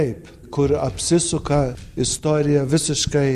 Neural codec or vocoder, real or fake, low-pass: none; real; 14.4 kHz